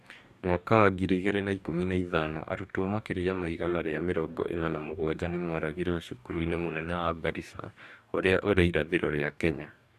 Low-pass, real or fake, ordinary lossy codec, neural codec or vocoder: 14.4 kHz; fake; none; codec, 44.1 kHz, 2.6 kbps, DAC